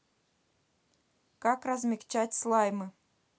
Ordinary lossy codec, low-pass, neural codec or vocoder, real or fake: none; none; none; real